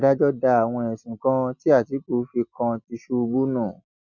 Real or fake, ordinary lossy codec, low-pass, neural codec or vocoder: real; none; 7.2 kHz; none